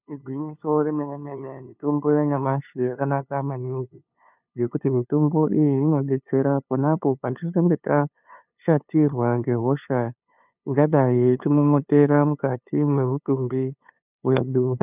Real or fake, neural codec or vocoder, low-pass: fake; codec, 16 kHz, 2 kbps, FunCodec, trained on LibriTTS, 25 frames a second; 3.6 kHz